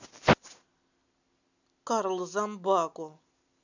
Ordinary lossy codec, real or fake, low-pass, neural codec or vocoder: none; real; 7.2 kHz; none